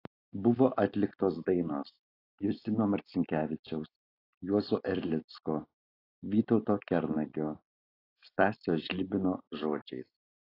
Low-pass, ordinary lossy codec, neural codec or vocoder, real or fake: 5.4 kHz; AAC, 24 kbps; none; real